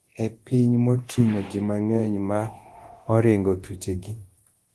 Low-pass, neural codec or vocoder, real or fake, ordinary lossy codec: 10.8 kHz; codec, 24 kHz, 0.9 kbps, DualCodec; fake; Opus, 16 kbps